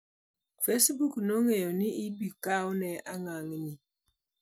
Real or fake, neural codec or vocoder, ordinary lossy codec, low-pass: real; none; none; none